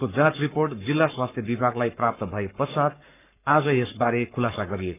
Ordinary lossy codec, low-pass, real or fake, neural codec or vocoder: none; 3.6 kHz; fake; codec, 44.1 kHz, 7.8 kbps, Pupu-Codec